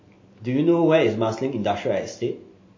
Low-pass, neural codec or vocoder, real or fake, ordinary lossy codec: 7.2 kHz; none; real; MP3, 32 kbps